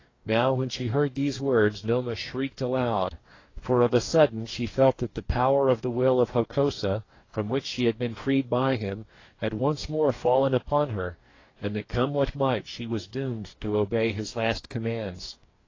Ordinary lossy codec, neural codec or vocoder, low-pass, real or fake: AAC, 32 kbps; codec, 44.1 kHz, 2.6 kbps, DAC; 7.2 kHz; fake